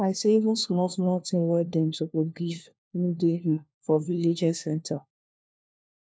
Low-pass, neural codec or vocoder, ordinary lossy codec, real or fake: none; codec, 16 kHz, 1 kbps, FunCodec, trained on LibriTTS, 50 frames a second; none; fake